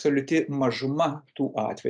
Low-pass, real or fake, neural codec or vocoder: 9.9 kHz; real; none